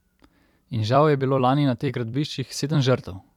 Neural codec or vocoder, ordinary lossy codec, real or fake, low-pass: vocoder, 44.1 kHz, 128 mel bands every 256 samples, BigVGAN v2; none; fake; 19.8 kHz